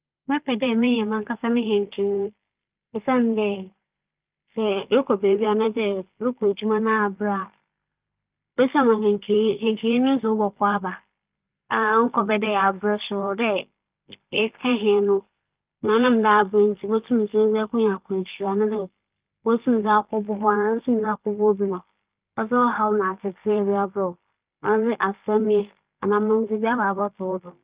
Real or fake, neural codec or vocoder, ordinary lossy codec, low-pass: fake; vocoder, 44.1 kHz, 128 mel bands every 512 samples, BigVGAN v2; Opus, 24 kbps; 3.6 kHz